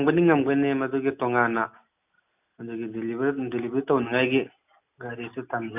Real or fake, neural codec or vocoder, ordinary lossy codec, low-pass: real; none; none; 3.6 kHz